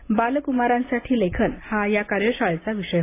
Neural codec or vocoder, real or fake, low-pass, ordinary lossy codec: none; real; 3.6 kHz; AAC, 24 kbps